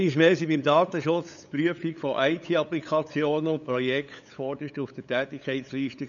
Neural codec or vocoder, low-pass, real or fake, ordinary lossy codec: codec, 16 kHz, 8 kbps, FunCodec, trained on LibriTTS, 25 frames a second; 7.2 kHz; fake; none